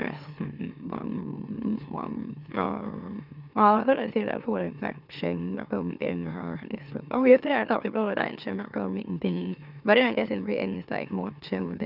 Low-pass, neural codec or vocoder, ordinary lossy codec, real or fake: 5.4 kHz; autoencoder, 44.1 kHz, a latent of 192 numbers a frame, MeloTTS; none; fake